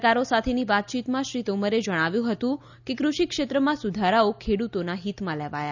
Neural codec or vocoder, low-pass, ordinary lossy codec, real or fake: none; 7.2 kHz; none; real